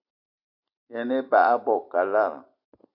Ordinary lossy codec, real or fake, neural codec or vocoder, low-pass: AAC, 48 kbps; real; none; 5.4 kHz